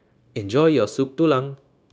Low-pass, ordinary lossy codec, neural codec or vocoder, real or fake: none; none; codec, 16 kHz, 0.9 kbps, LongCat-Audio-Codec; fake